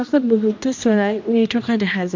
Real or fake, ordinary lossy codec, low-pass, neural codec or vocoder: fake; MP3, 48 kbps; 7.2 kHz; codec, 16 kHz, 1 kbps, X-Codec, HuBERT features, trained on general audio